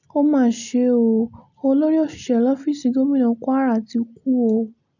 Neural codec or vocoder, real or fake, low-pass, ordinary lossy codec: none; real; 7.2 kHz; none